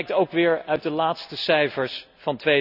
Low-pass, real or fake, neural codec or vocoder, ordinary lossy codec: 5.4 kHz; real; none; none